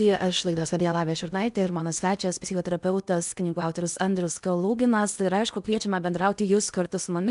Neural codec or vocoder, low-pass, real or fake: codec, 16 kHz in and 24 kHz out, 0.8 kbps, FocalCodec, streaming, 65536 codes; 10.8 kHz; fake